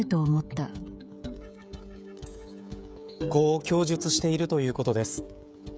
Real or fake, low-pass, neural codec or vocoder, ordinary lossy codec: fake; none; codec, 16 kHz, 16 kbps, FreqCodec, smaller model; none